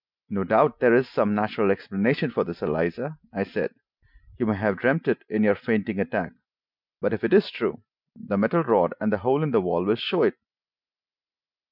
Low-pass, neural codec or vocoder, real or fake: 5.4 kHz; none; real